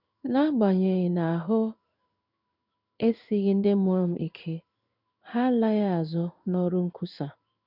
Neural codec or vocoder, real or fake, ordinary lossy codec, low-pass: codec, 16 kHz in and 24 kHz out, 1 kbps, XY-Tokenizer; fake; none; 5.4 kHz